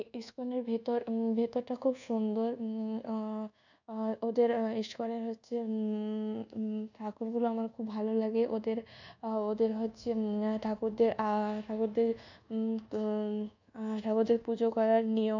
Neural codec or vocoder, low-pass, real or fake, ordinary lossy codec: codec, 24 kHz, 1.2 kbps, DualCodec; 7.2 kHz; fake; none